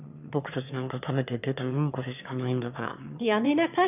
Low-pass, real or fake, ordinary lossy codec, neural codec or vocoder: 3.6 kHz; fake; none; autoencoder, 22.05 kHz, a latent of 192 numbers a frame, VITS, trained on one speaker